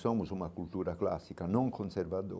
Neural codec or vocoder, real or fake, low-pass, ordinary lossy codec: none; real; none; none